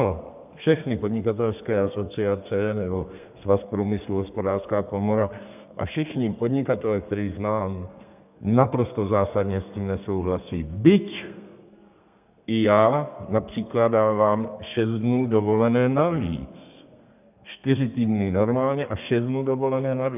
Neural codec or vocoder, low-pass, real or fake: codec, 32 kHz, 1.9 kbps, SNAC; 3.6 kHz; fake